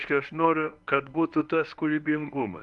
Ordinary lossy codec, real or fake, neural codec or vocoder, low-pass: Opus, 32 kbps; fake; codec, 24 kHz, 0.9 kbps, WavTokenizer, medium speech release version 1; 10.8 kHz